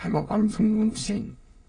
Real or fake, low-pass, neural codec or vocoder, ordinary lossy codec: fake; 9.9 kHz; autoencoder, 22.05 kHz, a latent of 192 numbers a frame, VITS, trained on many speakers; AAC, 32 kbps